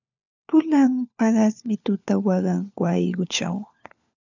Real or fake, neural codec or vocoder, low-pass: fake; codec, 16 kHz, 4 kbps, FunCodec, trained on LibriTTS, 50 frames a second; 7.2 kHz